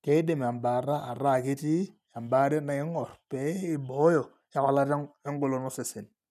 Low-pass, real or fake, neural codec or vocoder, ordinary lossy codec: 14.4 kHz; real; none; none